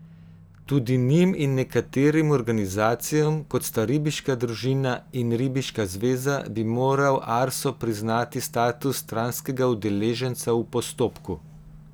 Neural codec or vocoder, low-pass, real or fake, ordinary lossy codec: none; none; real; none